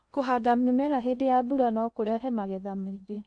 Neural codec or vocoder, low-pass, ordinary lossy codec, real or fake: codec, 16 kHz in and 24 kHz out, 0.6 kbps, FocalCodec, streaming, 2048 codes; 9.9 kHz; none; fake